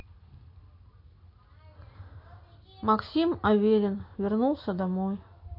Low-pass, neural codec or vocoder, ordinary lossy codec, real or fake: 5.4 kHz; none; MP3, 32 kbps; real